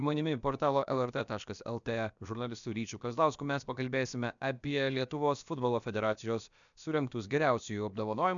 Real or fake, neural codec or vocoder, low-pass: fake; codec, 16 kHz, about 1 kbps, DyCAST, with the encoder's durations; 7.2 kHz